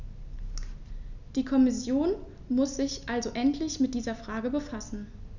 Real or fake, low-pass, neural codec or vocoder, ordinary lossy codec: real; 7.2 kHz; none; none